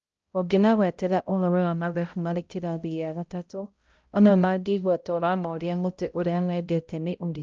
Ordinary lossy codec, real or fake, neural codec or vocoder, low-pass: Opus, 24 kbps; fake; codec, 16 kHz, 0.5 kbps, X-Codec, HuBERT features, trained on balanced general audio; 7.2 kHz